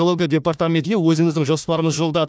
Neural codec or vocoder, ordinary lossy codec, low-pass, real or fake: codec, 16 kHz, 1 kbps, FunCodec, trained on Chinese and English, 50 frames a second; none; none; fake